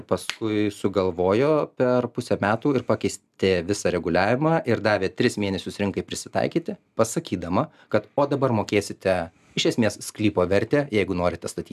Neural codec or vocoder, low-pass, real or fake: none; 14.4 kHz; real